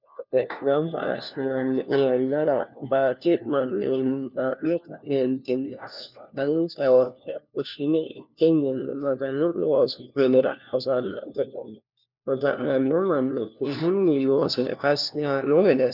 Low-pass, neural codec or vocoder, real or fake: 5.4 kHz; codec, 16 kHz, 1 kbps, FunCodec, trained on LibriTTS, 50 frames a second; fake